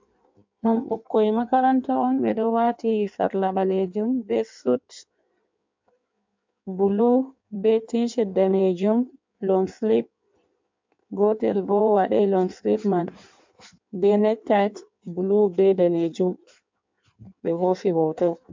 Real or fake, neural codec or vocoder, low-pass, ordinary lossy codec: fake; codec, 16 kHz in and 24 kHz out, 1.1 kbps, FireRedTTS-2 codec; 7.2 kHz; MP3, 64 kbps